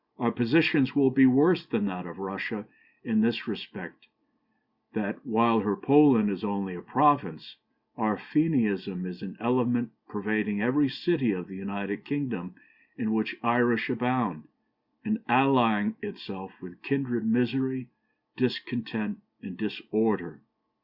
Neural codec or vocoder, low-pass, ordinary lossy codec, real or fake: none; 5.4 kHz; Opus, 64 kbps; real